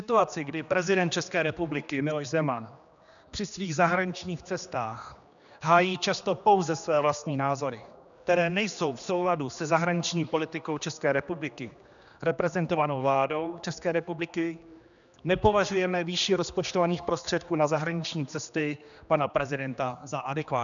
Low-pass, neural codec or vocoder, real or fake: 7.2 kHz; codec, 16 kHz, 2 kbps, X-Codec, HuBERT features, trained on general audio; fake